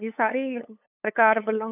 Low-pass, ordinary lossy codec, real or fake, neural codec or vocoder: 3.6 kHz; none; fake; codec, 16 kHz, 8 kbps, FunCodec, trained on LibriTTS, 25 frames a second